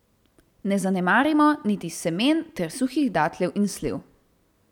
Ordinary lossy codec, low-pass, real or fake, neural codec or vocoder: none; 19.8 kHz; real; none